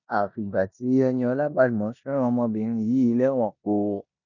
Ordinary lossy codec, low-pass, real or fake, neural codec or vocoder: none; 7.2 kHz; fake; codec, 16 kHz in and 24 kHz out, 0.9 kbps, LongCat-Audio-Codec, four codebook decoder